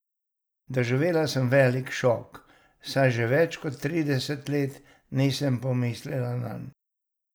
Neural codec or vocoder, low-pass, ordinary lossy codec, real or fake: none; none; none; real